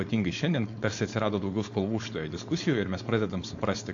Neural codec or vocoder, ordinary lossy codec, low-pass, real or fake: codec, 16 kHz, 4.8 kbps, FACodec; AAC, 48 kbps; 7.2 kHz; fake